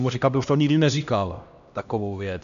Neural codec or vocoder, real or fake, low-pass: codec, 16 kHz, 1 kbps, X-Codec, HuBERT features, trained on LibriSpeech; fake; 7.2 kHz